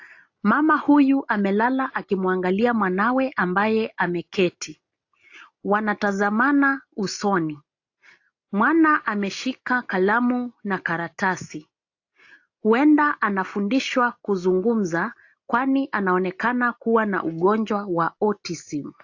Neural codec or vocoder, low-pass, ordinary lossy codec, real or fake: none; 7.2 kHz; AAC, 48 kbps; real